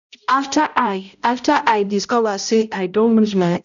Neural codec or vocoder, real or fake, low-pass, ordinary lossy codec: codec, 16 kHz, 0.5 kbps, X-Codec, HuBERT features, trained on balanced general audio; fake; 7.2 kHz; none